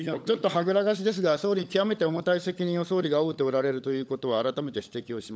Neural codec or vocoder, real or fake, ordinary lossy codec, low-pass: codec, 16 kHz, 16 kbps, FunCodec, trained on LibriTTS, 50 frames a second; fake; none; none